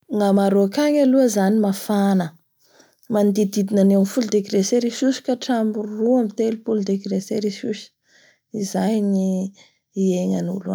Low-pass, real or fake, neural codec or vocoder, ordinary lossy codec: none; real; none; none